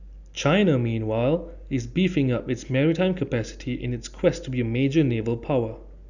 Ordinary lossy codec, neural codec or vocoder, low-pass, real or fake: none; none; 7.2 kHz; real